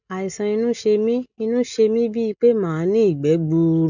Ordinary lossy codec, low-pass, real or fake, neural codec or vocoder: none; 7.2 kHz; real; none